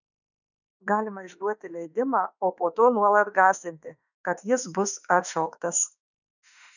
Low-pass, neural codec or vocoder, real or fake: 7.2 kHz; autoencoder, 48 kHz, 32 numbers a frame, DAC-VAE, trained on Japanese speech; fake